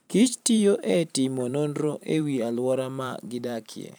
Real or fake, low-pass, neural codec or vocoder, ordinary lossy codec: fake; none; vocoder, 44.1 kHz, 128 mel bands every 512 samples, BigVGAN v2; none